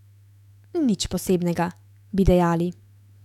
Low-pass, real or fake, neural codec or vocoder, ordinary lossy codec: 19.8 kHz; fake; autoencoder, 48 kHz, 128 numbers a frame, DAC-VAE, trained on Japanese speech; none